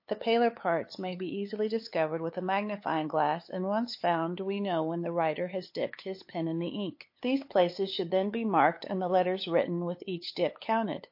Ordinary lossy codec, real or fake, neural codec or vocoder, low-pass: MP3, 32 kbps; fake; codec, 16 kHz, 8 kbps, FunCodec, trained on LibriTTS, 25 frames a second; 5.4 kHz